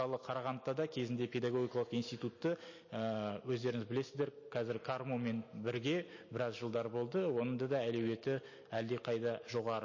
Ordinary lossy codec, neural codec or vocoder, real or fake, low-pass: MP3, 32 kbps; none; real; 7.2 kHz